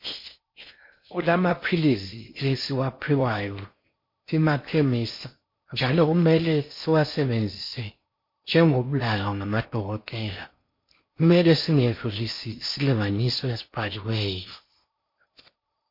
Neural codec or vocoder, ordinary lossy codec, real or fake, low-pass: codec, 16 kHz in and 24 kHz out, 0.6 kbps, FocalCodec, streaming, 4096 codes; MP3, 32 kbps; fake; 5.4 kHz